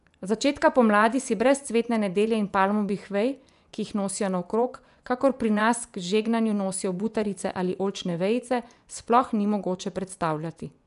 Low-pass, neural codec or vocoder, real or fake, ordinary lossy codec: 10.8 kHz; vocoder, 24 kHz, 100 mel bands, Vocos; fake; none